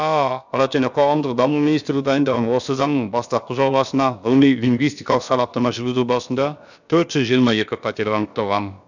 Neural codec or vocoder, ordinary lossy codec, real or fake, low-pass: codec, 16 kHz, about 1 kbps, DyCAST, with the encoder's durations; none; fake; 7.2 kHz